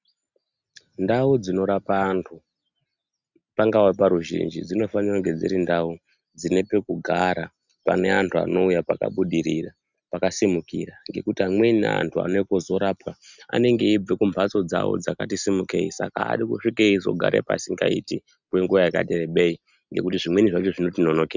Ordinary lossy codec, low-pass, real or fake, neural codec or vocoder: Opus, 64 kbps; 7.2 kHz; real; none